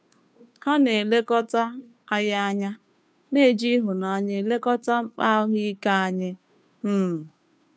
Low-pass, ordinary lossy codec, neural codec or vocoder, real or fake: none; none; codec, 16 kHz, 2 kbps, FunCodec, trained on Chinese and English, 25 frames a second; fake